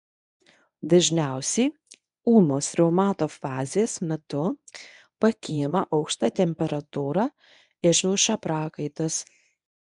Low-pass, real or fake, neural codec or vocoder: 10.8 kHz; fake; codec, 24 kHz, 0.9 kbps, WavTokenizer, medium speech release version 1